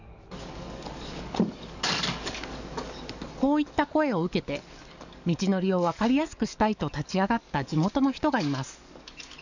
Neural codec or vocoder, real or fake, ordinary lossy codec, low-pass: codec, 44.1 kHz, 7.8 kbps, DAC; fake; none; 7.2 kHz